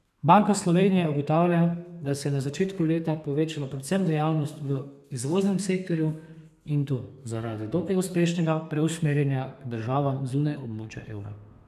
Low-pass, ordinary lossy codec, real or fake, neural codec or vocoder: 14.4 kHz; none; fake; codec, 32 kHz, 1.9 kbps, SNAC